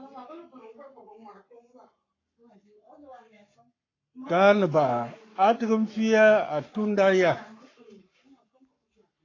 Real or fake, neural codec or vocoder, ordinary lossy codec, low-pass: fake; codec, 44.1 kHz, 7.8 kbps, Pupu-Codec; AAC, 32 kbps; 7.2 kHz